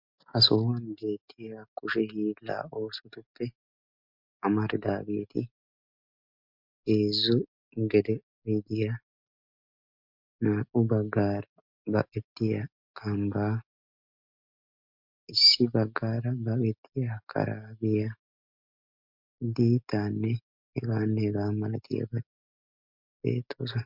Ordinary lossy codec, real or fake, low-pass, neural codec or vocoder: MP3, 48 kbps; real; 5.4 kHz; none